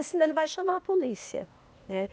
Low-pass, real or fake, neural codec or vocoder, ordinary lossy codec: none; fake; codec, 16 kHz, 0.8 kbps, ZipCodec; none